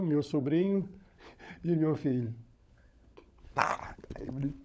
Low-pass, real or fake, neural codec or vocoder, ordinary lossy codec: none; fake; codec, 16 kHz, 16 kbps, FunCodec, trained on LibriTTS, 50 frames a second; none